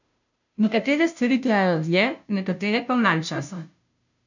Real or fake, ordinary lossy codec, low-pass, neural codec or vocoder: fake; none; 7.2 kHz; codec, 16 kHz, 0.5 kbps, FunCodec, trained on Chinese and English, 25 frames a second